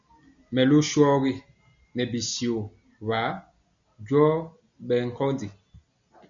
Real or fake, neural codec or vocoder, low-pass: real; none; 7.2 kHz